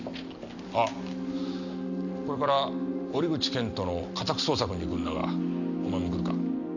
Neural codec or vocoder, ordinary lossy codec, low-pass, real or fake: none; none; 7.2 kHz; real